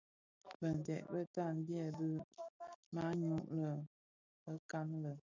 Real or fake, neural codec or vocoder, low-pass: real; none; 7.2 kHz